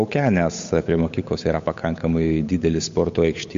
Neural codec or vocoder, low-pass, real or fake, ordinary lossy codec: codec, 16 kHz, 8 kbps, FunCodec, trained on Chinese and English, 25 frames a second; 7.2 kHz; fake; MP3, 48 kbps